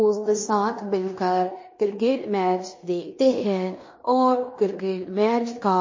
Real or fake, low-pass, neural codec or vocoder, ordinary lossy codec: fake; 7.2 kHz; codec, 16 kHz in and 24 kHz out, 0.9 kbps, LongCat-Audio-Codec, fine tuned four codebook decoder; MP3, 32 kbps